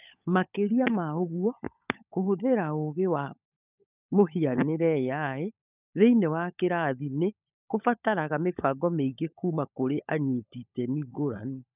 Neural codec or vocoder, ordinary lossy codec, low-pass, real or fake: codec, 16 kHz, 16 kbps, FunCodec, trained on LibriTTS, 50 frames a second; none; 3.6 kHz; fake